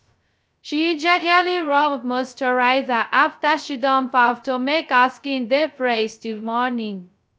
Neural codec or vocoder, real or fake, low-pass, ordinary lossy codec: codec, 16 kHz, 0.2 kbps, FocalCodec; fake; none; none